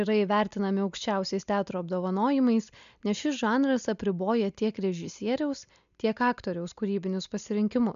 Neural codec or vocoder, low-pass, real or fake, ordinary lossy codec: none; 7.2 kHz; real; MP3, 96 kbps